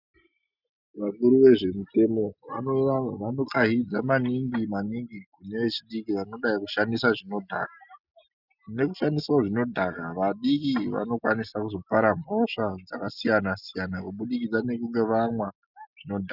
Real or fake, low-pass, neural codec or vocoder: real; 5.4 kHz; none